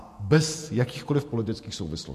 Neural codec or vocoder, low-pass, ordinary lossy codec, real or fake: vocoder, 48 kHz, 128 mel bands, Vocos; 14.4 kHz; MP3, 64 kbps; fake